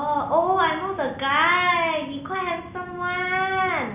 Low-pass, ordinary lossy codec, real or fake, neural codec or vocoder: 3.6 kHz; none; real; none